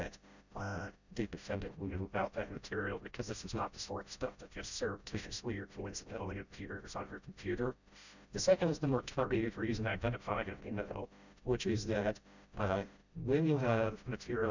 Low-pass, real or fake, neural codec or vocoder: 7.2 kHz; fake; codec, 16 kHz, 0.5 kbps, FreqCodec, smaller model